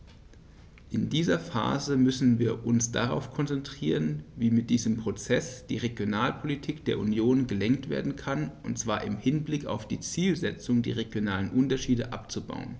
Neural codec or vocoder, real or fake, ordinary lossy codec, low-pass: none; real; none; none